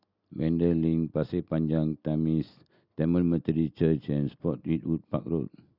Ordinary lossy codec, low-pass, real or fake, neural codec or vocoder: Opus, 64 kbps; 5.4 kHz; real; none